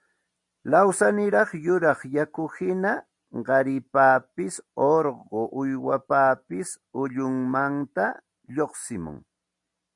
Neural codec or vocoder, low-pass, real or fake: none; 10.8 kHz; real